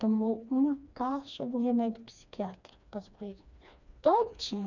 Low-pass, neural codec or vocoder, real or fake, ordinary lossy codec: 7.2 kHz; codec, 16 kHz, 2 kbps, FreqCodec, smaller model; fake; none